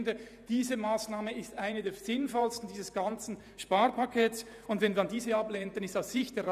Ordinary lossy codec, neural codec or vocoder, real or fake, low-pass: none; vocoder, 48 kHz, 128 mel bands, Vocos; fake; 14.4 kHz